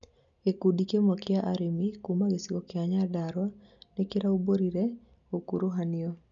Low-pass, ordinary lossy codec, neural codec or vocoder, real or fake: 7.2 kHz; none; none; real